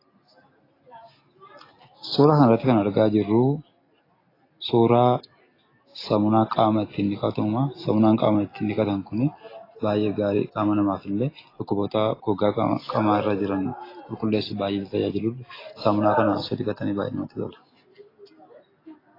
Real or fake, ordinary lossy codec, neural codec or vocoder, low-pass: real; AAC, 24 kbps; none; 5.4 kHz